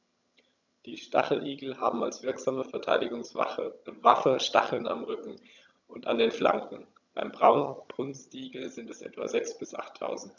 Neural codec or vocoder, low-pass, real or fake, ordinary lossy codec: vocoder, 22.05 kHz, 80 mel bands, HiFi-GAN; 7.2 kHz; fake; none